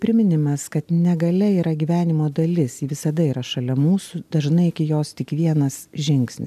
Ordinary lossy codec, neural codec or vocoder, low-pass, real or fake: MP3, 96 kbps; none; 14.4 kHz; real